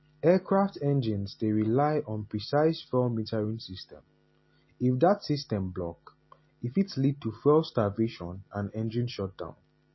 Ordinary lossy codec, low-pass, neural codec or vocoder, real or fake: MP3, 24 kbps; 7.2 kHz; none; real